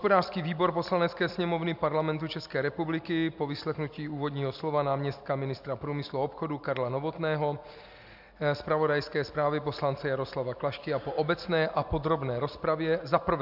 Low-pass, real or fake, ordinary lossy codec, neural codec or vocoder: 5.4 kHz; real; AAC, 48 kbps; none